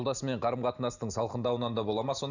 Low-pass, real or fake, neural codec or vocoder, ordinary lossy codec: 7.2 kHz; real; none; none